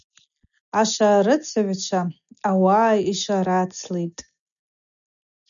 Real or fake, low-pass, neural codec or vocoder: real; 7.2 kHz; none